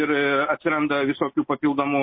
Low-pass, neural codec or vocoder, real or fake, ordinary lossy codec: 5.4 kHz; none; real; MP3, 24 kbps